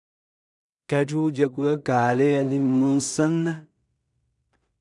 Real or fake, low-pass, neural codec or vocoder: fake; 10.8 kHz; codec, 16 kHz in and 24 kHz out, 0.4 kbps, LongCat-Audio-Codec, two codebook decoder